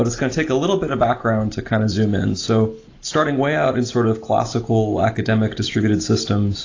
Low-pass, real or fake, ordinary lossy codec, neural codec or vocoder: 7.2 kHz; real; AAC, 32 kbps; none